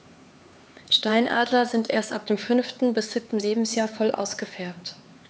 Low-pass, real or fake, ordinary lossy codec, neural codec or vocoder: none; fake; none; codec, 16 kHz, 4 kbps, X-Codec, HuBERT features, trained on LibriSpeech